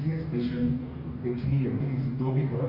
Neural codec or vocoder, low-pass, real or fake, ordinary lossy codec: codec, 44.1 kHz, 2.6 kbps, DAC; 5.4 kHz; fake; Opus, 64 kbps